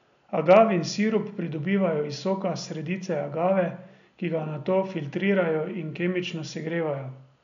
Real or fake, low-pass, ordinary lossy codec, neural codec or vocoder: real; 7.2 kHz; none; none